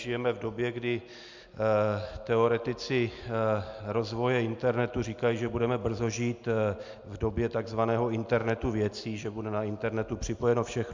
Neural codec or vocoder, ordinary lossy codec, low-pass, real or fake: none; MP3, 64 kbps; 7.2 kHz; real